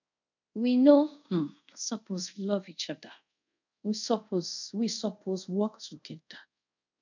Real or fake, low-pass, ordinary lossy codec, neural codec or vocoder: fake; 7.2 kHz; none; codec, 24 kHz, 0.5 kbps, DualCodec